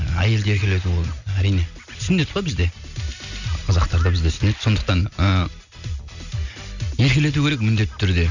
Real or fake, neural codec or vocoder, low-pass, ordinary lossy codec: real; none; 7.2 kHz; none